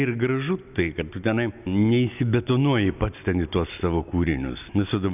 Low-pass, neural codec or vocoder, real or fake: 3.6 kHz; none; real